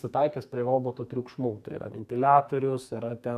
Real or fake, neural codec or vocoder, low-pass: fake; codec, 32 kHz, 1.9 kbps, SNAC; 14.4 kHz